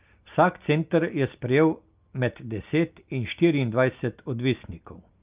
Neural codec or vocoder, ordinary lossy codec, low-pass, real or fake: none; Opus, 24 kbps; 3.6 kHz; real